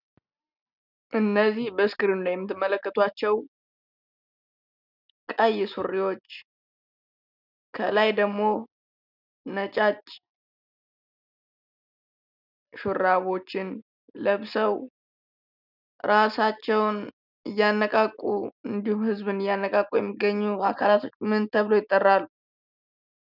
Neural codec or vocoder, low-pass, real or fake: none; 5.4 kHz; real